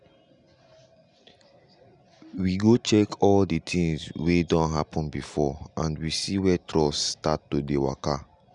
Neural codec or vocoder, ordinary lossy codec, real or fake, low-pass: none; none; real; 10.8 kHz